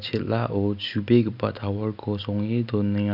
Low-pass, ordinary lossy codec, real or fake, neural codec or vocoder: 5.4 kHz; none; real; none